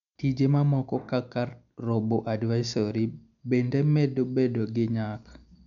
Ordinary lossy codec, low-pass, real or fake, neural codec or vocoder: none; 7.2 kHz; real; none